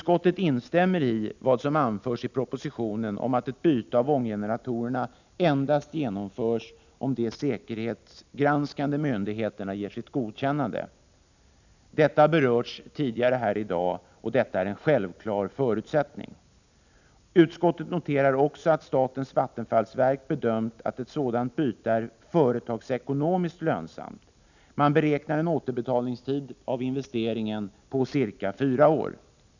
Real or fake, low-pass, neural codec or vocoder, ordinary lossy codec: real; 7.2 kHz; none; none